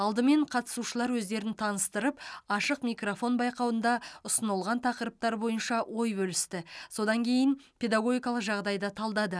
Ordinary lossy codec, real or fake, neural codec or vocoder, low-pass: none; real; none; none